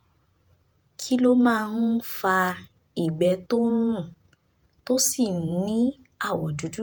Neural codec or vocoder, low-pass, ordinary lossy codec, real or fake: vocoder, 48 kHz, 128 mel bands, Vocos; none; none; fake